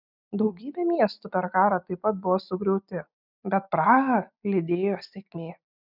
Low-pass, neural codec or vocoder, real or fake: 5.4 kHz; none; real